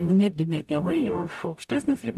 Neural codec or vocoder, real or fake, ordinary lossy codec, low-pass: codec, 44.1 kHz, 0.9 kbps, DAC; fake; AAC, 96 kbps; 14.4 kHz